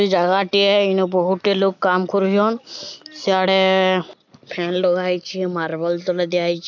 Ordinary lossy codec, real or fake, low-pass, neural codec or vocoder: none; real; 7.2 kHz; none